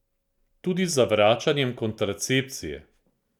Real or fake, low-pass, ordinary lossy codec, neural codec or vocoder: real; 19.8 kHz; none; none